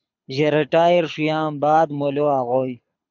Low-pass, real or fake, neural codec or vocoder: 7.2 kHz; fake; codec, 24 kHz, 6 kbps, HILCodec